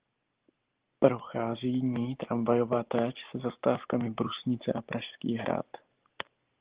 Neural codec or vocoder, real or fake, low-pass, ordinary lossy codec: none; real; 3.6 kHz; Opus, 16 kbps